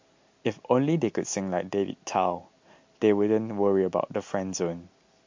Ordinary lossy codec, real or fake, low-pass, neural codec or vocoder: MP3, 48 kbps; real; 7.2 kHz; none